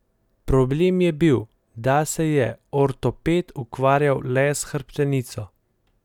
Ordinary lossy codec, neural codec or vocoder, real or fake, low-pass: none; none; real; 19.8 kHz